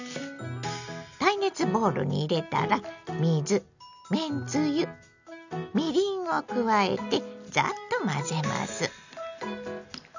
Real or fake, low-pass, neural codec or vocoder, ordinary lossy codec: real; 7.2 kHz; none; none